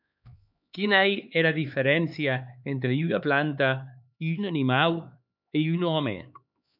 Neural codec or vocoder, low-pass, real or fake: codec, 16 kHz, 4 kbps, X-Codec, HuBERT features, trained on LibriSpeech; 5.4 kHz; fake